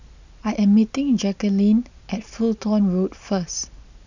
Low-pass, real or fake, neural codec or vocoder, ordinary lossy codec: 7.2 kHz; real; none; none